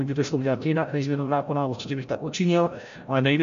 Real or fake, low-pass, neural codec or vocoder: fake; 7.2 kHz; codec, 16 kHz, 0.5 kbps, FreqCodec, larger model